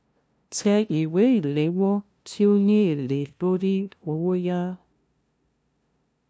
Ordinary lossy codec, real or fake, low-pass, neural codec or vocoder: none; fake; none; codec, 16 kHz, 0.5 kbps, FunCodec, trained on LibriTTS, 25 frames a second